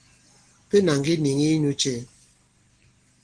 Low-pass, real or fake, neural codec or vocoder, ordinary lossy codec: 9.9 kHz; real; none; Opus, 16 kbps